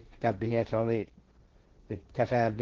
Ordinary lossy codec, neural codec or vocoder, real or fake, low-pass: Opus, 16 kbps; codec, 16 kHz, 1.1 kbps, Voila-Tokenizer; fake; 7.2 kHz